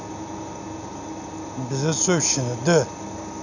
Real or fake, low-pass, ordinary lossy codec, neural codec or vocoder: real; 7.2 kHz; none; none